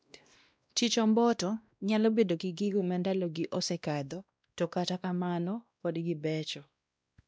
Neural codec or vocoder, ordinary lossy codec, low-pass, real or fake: codec, 16 kHz, 1 kbps, X-Codec, WavLM features, trained on Multilingual LibriSpeech; none; none; fake